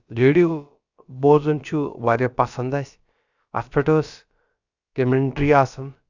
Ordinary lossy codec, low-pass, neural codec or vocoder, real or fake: Opus, 64 kbps; 7.2 kHz; codec, 16 kHz, about 1 kbps, DyCAST, with the encoder's durations; fake